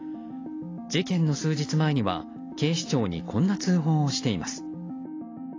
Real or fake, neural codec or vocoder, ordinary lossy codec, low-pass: real; none; AAC, 32 kbps; 7.2 kHz